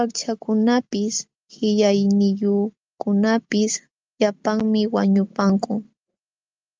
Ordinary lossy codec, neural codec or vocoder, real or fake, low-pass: Opus, 24 kbps; none; real; 7.2 kHz